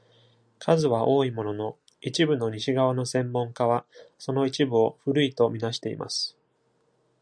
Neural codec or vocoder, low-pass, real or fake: none; 9.9 kHz; real